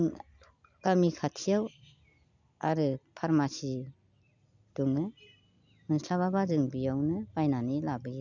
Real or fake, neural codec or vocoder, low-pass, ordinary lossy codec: real; none; 7.2 kHz; none